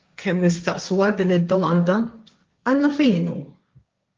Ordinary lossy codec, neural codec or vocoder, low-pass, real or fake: Opus, 24 kbps; codec, 16 kHz, 1.1 kbps, Voila-Tokenizer; 7.2 kHz; fake